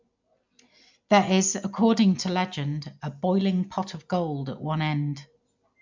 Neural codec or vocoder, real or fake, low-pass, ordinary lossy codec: none; real; 7.2 kHz; MP3, 64 kbps